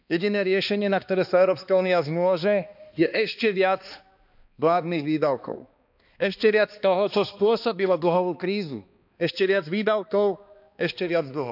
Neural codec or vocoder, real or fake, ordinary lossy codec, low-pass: codec, 16 kHz, 2 kbps, X-Codec, HuBERT features, trained on balanced general audio; fake; none; 5.4 kHz